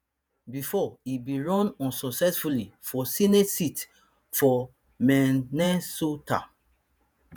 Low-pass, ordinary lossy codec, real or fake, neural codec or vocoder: none; none; fake; vocoder, 48 kHz, 128 mel bands, Vocos